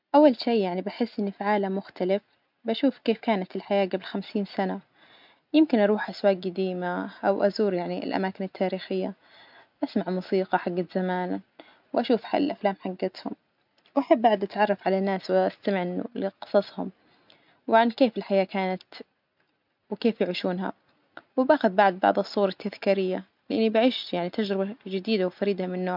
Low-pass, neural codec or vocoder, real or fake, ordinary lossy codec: 5.4 kHz; none; real; MP3, 48 kbps